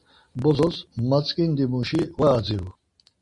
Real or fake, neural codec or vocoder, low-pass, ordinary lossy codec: real; none; 10.8 kHz; MP3, 48 kbps